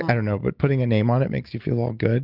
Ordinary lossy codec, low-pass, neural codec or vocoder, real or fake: Opus, 24 kbps; 5.4 kHz; none; real